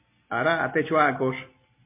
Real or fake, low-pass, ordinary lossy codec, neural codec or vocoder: real; 3.6 kHz; MP3, 24 kbps; none